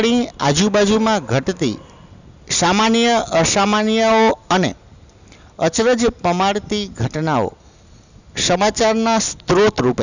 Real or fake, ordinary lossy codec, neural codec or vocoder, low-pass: real; none; none; 7.2 kHz